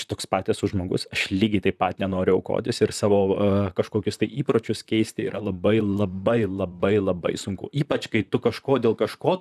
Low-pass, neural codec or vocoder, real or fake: 14.4 kHz; vocoder, 44.1 kHz, 128 mel bands, Pupu-Vocoder; fake